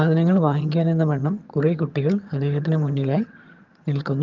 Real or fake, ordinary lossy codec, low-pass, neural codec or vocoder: fake; Opus, 24 kbps; 7.2 kHz; vocoder, 22.05 kHz, 80 mel bands, HiFi-GAN